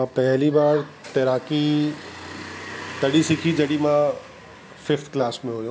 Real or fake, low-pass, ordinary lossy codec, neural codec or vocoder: real; none; none; none